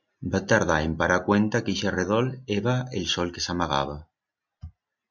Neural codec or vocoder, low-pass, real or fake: none; 7.2 kHz; real